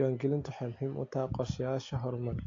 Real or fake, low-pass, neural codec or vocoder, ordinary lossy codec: real; 7.2 kHz; none; AAC, 48 kbps